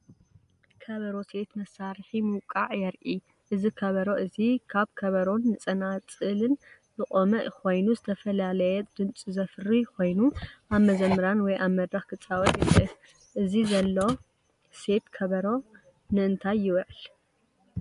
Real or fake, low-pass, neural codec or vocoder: real; 10.8 kHz; none